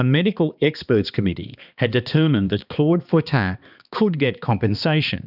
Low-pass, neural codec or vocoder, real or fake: 5.4 kHz; codec, 16 kHz, 2 kbps, X-Codec, HuBERT features, trained on balanced general audio; fake